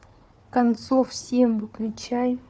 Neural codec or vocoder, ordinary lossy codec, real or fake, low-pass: codec, 16 kHz, 4 kbps, FunCodec, trained on Chinese and English, 50 frames a second; none; fake; none